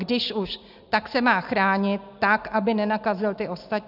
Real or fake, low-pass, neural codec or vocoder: real; 5.4 kHz; none